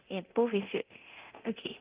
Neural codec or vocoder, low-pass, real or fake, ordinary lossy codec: codec, 24 kHz, 0.9 kbps, DualCodec; 3.6 kHz; fake; Opus, 32 kbps